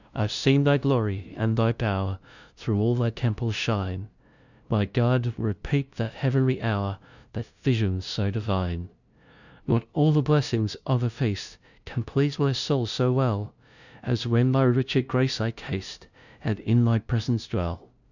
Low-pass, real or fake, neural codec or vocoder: 7.2 kHz; fake; codec, 16 kHz, 0.5 kbps, FunCodec, trained on LibriTTS, 25 frames a second